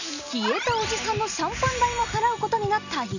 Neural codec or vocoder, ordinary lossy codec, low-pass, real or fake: none; none; 7.2 kHz; real